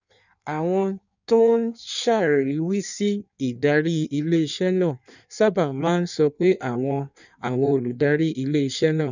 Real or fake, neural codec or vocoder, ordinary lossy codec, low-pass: fake; codec, 16 kHz in and 24 kHz out, 1.1 kbps, FireRedTTS-2 codec; none; 7.2 kHz